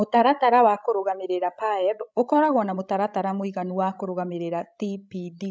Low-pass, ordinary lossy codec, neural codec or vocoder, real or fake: none; none; codec, 16 kHz, 16 kbps, FreqCodec, larger model; fake